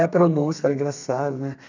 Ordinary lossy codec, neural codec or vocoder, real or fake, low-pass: none; codec, 32 kHz, 1.9 kbps, SNAC; fake; 7.2 kHz